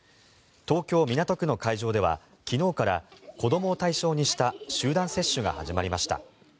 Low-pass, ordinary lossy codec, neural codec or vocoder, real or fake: none; none; none; real